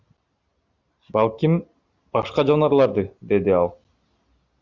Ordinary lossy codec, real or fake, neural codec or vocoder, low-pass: Opus, 64 kbps; real; none; 7.2 kHz